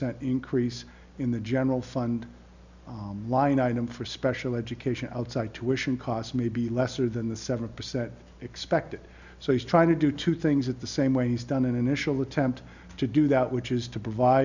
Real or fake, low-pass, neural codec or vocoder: real; 7.2 kHz; none